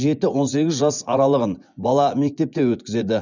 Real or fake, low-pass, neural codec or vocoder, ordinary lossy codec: fake; 7.2 kHz; vocoder, 24 kHz, 100 mel bands, Vocos; none